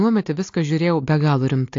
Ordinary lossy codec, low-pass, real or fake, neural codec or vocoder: AAC, 48 kbps; 7.2 kHz; real; none